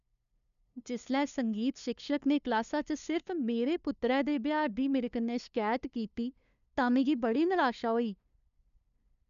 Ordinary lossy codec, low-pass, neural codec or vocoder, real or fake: none; 7.2 kHz; codec, 16 kHz, 2 kbps, FunCodec, trained on LibriTTS, 25 frames a second; fake